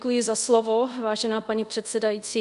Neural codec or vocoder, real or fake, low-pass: codec, 24 kHz, 0.5 kbps, DualCodec; fake; 10.8 kHz